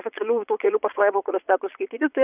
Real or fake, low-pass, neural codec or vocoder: fake; 3.6 kHz; vocoder, 22.05 kHz, 80 mel bands, Vocos